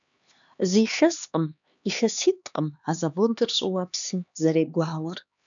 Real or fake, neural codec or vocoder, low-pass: fake; codec, 16 kHz, 2 kbps, X-Codec, HuBERT features, trained on LibriSpeech; 7.2 kHz